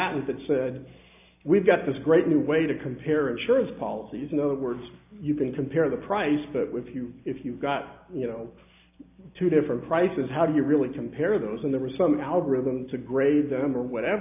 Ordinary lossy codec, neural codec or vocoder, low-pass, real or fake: AAC, 32 kbps; none; 3.6 kHz; real